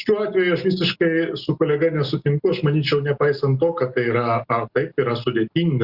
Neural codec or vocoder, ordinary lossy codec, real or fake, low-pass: none; Opus, 64 kbps; real; 5.4 kHz